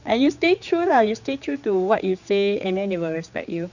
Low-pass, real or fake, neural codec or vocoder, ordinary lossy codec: 7.2 kHz; fake; codec, 16 kHz, 4 kbps, X-Codec, HuBERT features, trained on general audio; none